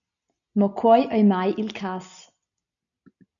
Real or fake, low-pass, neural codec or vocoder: real; 7.2 kHz; none